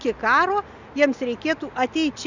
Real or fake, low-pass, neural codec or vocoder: real; 7.2 kHz; none